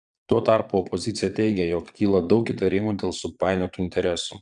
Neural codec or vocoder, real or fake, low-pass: vocoder, 22.05 kHz, 80 mel bands, Vocos; fake; 9.9 kHz